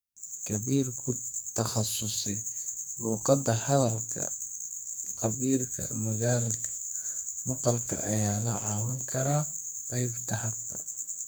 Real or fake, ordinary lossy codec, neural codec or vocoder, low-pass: fake; none; codec, 44.1 kHz, 2.6 kbps, SNAC; none